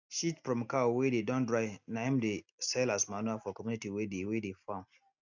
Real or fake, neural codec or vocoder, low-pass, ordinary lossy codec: real; none; 7.2 kHz; none